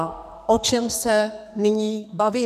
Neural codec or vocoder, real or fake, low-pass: codec, 44.1 kHz, 2.6 kbps, SNAC; fake; 14.4 kHz